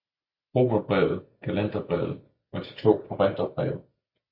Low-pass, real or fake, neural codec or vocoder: 5.4 kHz; real; none